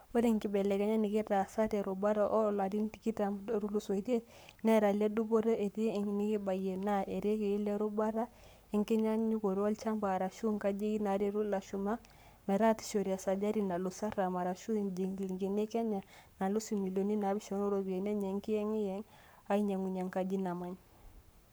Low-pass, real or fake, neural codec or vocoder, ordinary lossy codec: none; fake; codec, 44.1 kHz, 7.8 kbps, Pupu-Codec; none